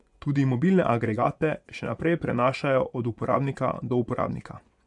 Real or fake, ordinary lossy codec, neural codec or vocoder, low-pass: fake; none; vocoder, 24 kHz, 100 mel bands, Vocos; 10.8 kHz